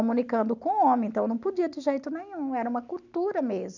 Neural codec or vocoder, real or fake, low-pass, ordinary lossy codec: none; real; 7.2 kHz; none